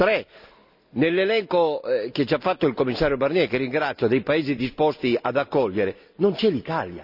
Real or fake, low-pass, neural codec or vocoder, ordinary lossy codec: real; 5.4 kHz; none; none